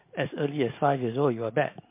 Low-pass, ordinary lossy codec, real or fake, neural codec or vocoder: 3.6 kHz; MP3, 24 kbps; real; none